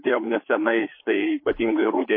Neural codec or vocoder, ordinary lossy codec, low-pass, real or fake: codec, 16 kHz, 4 kbps, FreqCodec, larger model; MP3, 24 kbps; 5.4 kHz; fake